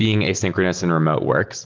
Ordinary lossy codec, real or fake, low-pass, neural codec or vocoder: Opus, 16 kbps; real; 7.2 kHz; none